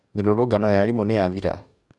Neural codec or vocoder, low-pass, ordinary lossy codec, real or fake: codec, 44.1 kHz, 2.6 kbps, DAC; 10.8 kHz; none; fake